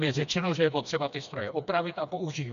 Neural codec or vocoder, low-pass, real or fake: codec, 16 kHz, 2 kbps, FreqCodec, smaller model; 7.2 kHz; fake